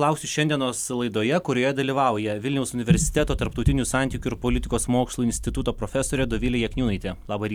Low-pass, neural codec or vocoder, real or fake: 19.8 kHz; none; real